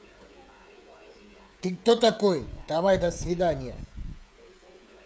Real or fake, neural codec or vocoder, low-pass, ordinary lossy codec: fake; codec, 16 kHz, 16 kbps, FreqCodec, smaller model; none; none